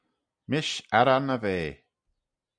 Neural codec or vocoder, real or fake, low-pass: none; real; 9.9 kHz